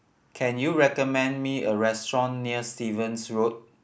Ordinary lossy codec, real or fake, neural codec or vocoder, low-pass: none; real; none; none